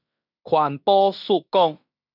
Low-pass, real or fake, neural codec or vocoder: 5.4 kHz; fake; codec, 16 kHz in and 24 kHz out, 0.9 kbps, LongCat-Audio-Codec, fine tuned four codebook decoder